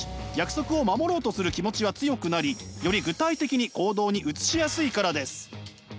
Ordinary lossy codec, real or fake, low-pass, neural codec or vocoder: none; real; none; none